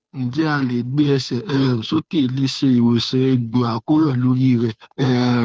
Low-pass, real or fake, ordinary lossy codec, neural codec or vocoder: none; fake; none; codec, 16 kHz, 2 kbps, FunCodec, trained on Chinese and English, 25 frames a second